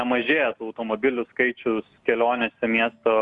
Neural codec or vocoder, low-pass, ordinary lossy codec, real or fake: none; 10.8 kHz; Opus, 64 kbps; real